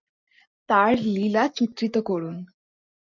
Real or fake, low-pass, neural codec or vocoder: real; 7.2 kHz; none